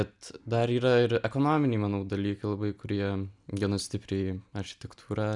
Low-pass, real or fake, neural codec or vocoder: 10.8 kHz; real; none